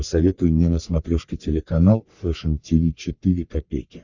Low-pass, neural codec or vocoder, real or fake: 7.2 kHz; codec, 44.1 kHz, 3.4 kbps, Pupu-Codec; fake